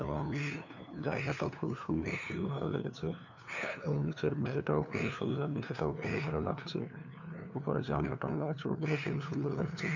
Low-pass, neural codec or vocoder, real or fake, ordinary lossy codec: 7.2 kHz; codec, 16 kHz, 2 kbps, FunCodec, trained on LibriTTS, 25 frames a second; fake; none